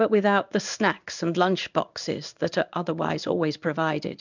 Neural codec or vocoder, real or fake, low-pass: codec, 16 kHz in and 24 kHz out, 1 kbps, XY-Tokenizer; fake; 7.2 kHz